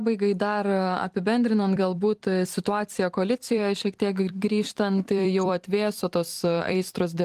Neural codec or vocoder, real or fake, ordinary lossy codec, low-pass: vocoder, 44.1 kHz, 128 mel bands every 256 samples, BigVGAN v2; fake; Opus, 24 kbps; 14.4 kHz